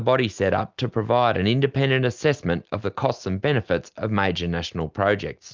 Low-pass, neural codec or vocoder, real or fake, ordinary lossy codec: 7.2 kHz; none; real; Opus, 32 kbps